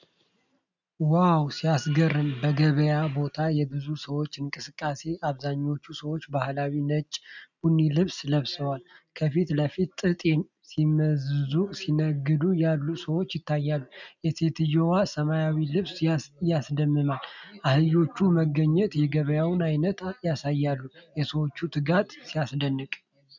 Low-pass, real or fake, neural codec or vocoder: 7.2 kHz; real; none